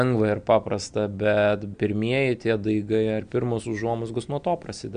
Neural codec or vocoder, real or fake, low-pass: none; real; 9.9 kHz